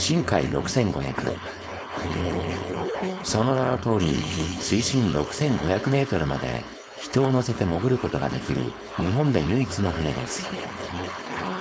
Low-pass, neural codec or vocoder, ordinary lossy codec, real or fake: none; codec, 16 kHz, 4.8 kbps, FACodec; none; fake